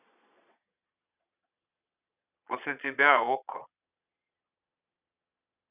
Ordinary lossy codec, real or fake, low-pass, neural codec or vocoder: none; fake; 3.6 kHz; vocoder, 22.05 kHz, 80 mel bands, Vocos